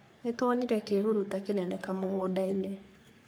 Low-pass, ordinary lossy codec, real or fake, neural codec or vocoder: none; none; fake; codec, 44.1 kHz, 3.4 kbps, Pupu-Codec